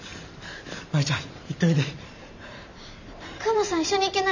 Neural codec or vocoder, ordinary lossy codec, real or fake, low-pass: vocoder, 44.1 kHz, 128 mel bands every 512 samples, BigVGAN v2; none; fake; 7.2 kHz